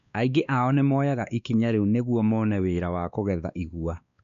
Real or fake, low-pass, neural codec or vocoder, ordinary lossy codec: fake; 7.2 kHz; codec, 16 kHz, 2 kbps, X-Codec, WavLM features, trained on Multilingual LibriSpeech; none